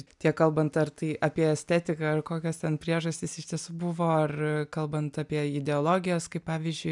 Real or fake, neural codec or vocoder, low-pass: real; none; 10.8 kHz